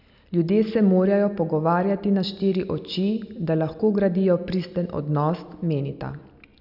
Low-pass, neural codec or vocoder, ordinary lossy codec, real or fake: 5.4 kHz; none; none; real